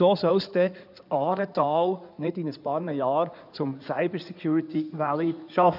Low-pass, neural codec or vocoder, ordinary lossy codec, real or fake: 5.4 kHz; codec, 16 kHz in and 24 kHz out, 2.2 kbps, FireRedTTS-2 codec; none; fake